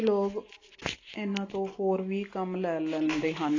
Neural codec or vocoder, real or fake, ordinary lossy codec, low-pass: none; real; AAC, 32 kbps; 7.2 kHz